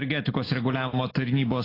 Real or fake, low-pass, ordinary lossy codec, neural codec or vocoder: real; 5.4 kHz; AAC, 24 kbps; none